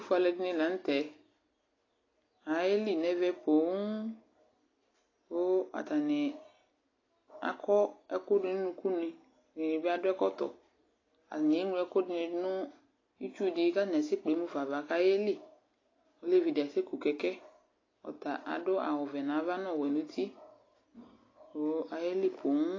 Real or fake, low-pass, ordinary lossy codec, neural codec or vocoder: real; 7.2 kHz; AAC, 32 kbps; none